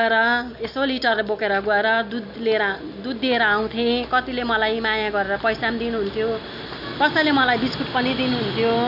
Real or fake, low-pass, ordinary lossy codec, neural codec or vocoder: real; 5.4 kHz; none; none